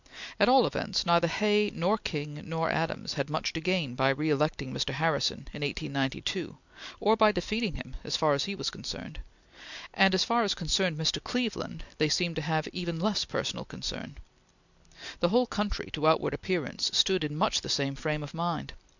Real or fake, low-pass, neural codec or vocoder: real; 7.2 kHz; none